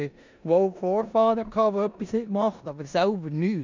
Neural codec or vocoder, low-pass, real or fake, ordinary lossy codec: codec, 16 kHz in and 24 kHz out, 0.9 kbps, LongCat-Audio-Codec, four codebook decoder; 7.2 kHz; fake; MP3, 64 kbps